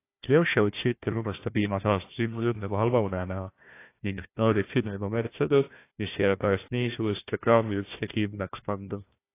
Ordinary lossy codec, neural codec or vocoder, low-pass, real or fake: AAC, 24 kbps; codec, 16 kHz, 1 kbps, FunCodec, trained on Chinese and English, 50 frames a second; 3.6 kHz; fake